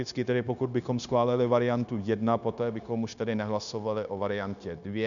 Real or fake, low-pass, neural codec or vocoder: fake; 7.2 kHz; codec, 16 kHz, 0.9 kbps, LongCat-Audio-Codec